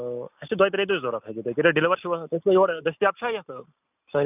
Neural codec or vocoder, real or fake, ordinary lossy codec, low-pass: none; real; none; 3.6 kHz